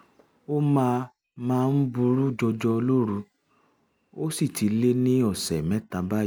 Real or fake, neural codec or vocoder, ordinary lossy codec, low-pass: real; none; none; none